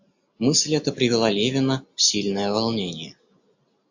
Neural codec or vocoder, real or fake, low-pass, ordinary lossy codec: none; real; 7.2 kHz; AAC, 48 kbps